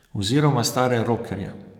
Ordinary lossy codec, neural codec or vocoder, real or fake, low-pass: none; codec, 44.1 kHz, 7.8 kbps, DAC; fake; 19.8 kHz